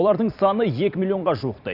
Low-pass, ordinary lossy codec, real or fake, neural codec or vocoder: 5.4 kHz; none; fake; vocoder, 44.1 kHz, 128 mel bands every 256 samples, BigVGAN v2